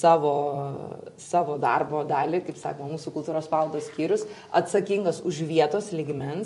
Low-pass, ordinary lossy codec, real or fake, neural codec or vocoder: 14.4 kHz; MP3, 48 kbps; fake; vocoder, 44.1 kHz, 128 mel bands every 256 samples, BigVGAN v2